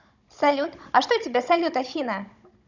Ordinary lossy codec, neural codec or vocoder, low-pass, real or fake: none; codec, 16 kHz, 16 kbps, FunCodec, trained on Chinese and English, 50 frames a second; 7.2 kHz; fake